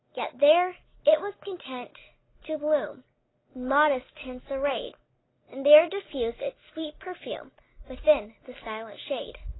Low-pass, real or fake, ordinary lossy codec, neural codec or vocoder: 7.2 kHz; real; AAC, 16 kbps; none